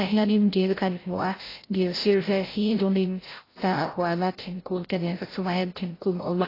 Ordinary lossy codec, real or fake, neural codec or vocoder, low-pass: AAC, 24 kbps; fake; codec, 16 kHz, 0.5 kbps, FreqCodec, larger model; 5.4 kHz